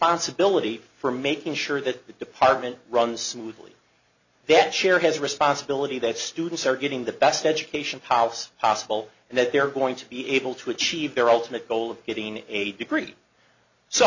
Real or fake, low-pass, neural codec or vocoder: real; 7.2 kHz; none